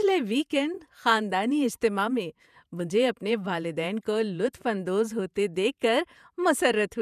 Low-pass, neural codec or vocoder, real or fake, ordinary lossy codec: 14.4 kHz; vocoder, 48 kHz, 128 mel bands, Vocos; fake; none